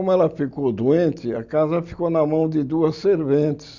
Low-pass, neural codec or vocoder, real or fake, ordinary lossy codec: 7.2 kHz; none; real; Opus, 64 kbps